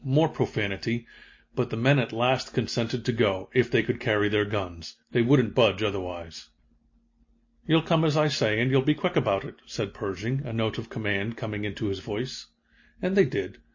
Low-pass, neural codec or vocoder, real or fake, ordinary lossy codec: 7.2 kHz; none; real; MP3, 32 kbps